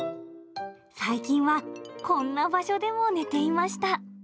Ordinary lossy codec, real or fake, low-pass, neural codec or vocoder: none; real; none; none